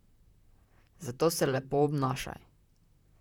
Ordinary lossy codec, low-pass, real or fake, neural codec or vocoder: none; 19.8 kHz; fake; vocoder, 44.1 kHz, 128 mel bands, Pupu-Vocoder